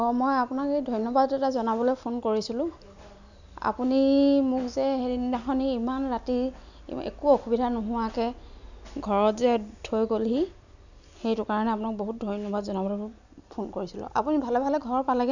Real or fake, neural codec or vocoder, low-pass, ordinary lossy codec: real; none; 7.2 kHz; none